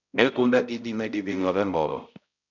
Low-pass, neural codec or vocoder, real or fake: 7.2 kHz; codec, 16 kHz, 0.5 kbps, X-Codec, HuBERT features, trained on balanced general audio; fake